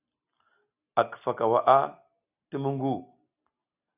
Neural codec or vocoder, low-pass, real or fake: none; 3.6 kHz; real